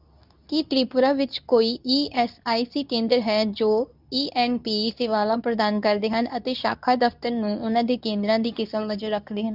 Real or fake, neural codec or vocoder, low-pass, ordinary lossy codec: fake; codec, 24 kHz, 0.9 kbps, WavTokenizer, medium speech release version 2; 5.4 kHz; none